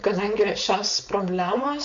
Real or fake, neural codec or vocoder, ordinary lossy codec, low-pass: fake; codec, 16 kHz, 4.8 kbps, FACodec; MP3, 64 kbps; 7.2 kHz